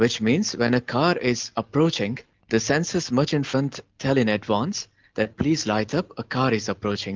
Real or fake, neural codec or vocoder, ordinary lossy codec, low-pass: real; none; Opus, 32 kbps; 7.2 kHz